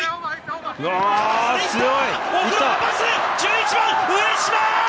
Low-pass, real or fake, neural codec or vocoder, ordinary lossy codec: none; real; none; none